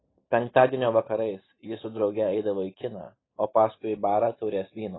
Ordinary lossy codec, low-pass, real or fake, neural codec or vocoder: AAC, 16 kbps; 7.2 kHz; real; none